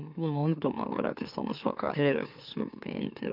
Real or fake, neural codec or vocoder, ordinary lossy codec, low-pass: fake; autoencoder, 44.1 kHz, a latent of 192 numbers a frame, MeloTTS; none; 5.4 kHz